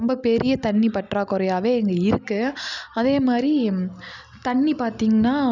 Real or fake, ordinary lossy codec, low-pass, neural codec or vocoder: real; none; 7.2 kHz; none